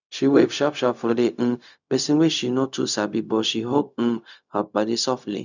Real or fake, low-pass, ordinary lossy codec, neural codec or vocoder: fake; 7.2 kHz; none; codec, 16 kHz, 0.4 kbps, LongCat-Audio-Codec